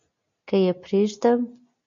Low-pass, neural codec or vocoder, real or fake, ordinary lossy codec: 7.2 kHz; none; real; MP3, 48 kbps